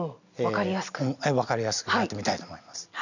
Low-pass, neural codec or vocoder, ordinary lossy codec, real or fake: 7.2 kHz; none; none; real